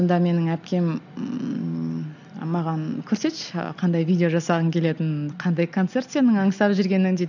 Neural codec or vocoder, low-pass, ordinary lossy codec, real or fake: none; 7.2 kHz; none; real